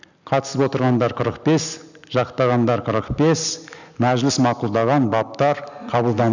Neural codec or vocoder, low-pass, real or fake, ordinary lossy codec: none; 7.2 kHz; real; none